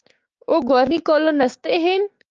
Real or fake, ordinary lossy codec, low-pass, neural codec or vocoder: fake; Opus, 24 kbps; 7.2 kHz; codec, 16 kHz, 6 kbps, DAC